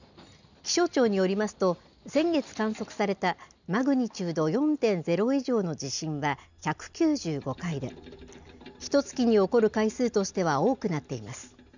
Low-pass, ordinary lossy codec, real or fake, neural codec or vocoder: 7.2 kHz; none; real; none